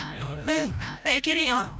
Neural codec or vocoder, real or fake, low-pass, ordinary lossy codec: codec, 16 kHz, 0.5 kbps, FreqCodec, larger model; fake; none; none